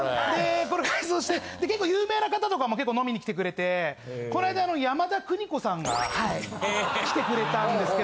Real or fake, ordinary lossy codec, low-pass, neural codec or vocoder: real; none; none; none